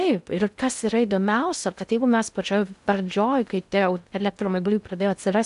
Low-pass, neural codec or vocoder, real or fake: 10.8 kHz; codec, 16 kHz in and 24 kHz out, 0.6 kbps, FocalCodec, streaming, 2048 codes; fake